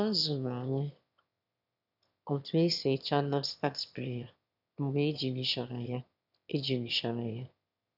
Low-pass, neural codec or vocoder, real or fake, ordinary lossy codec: 5.4 kHz; autoencoder, 22.05 kHz, a latent of 192 numbers a frame, VITS, trained on one speaker; fake; none